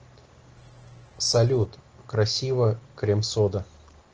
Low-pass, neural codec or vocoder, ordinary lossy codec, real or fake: 7.2 kHz; none; Opus, 16 kbps; real